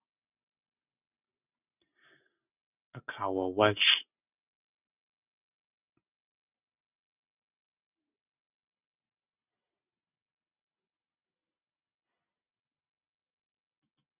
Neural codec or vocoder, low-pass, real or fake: none; 3.6 kHz; real